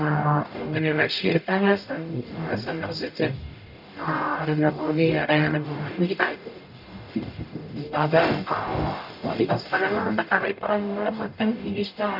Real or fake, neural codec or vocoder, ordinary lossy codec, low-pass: fake; codec, 44.1 kHz, 0.9 kbps, DAC; none; 5.4 kHz